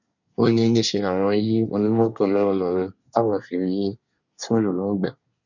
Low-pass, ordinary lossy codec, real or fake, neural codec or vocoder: 7.2 kHz; none; fake; codec, 24 kHz, 1 kbps, SNAC